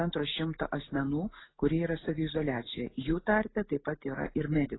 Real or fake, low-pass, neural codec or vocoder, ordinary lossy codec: real; 7.2 kHz; none; AAC, 16 kbps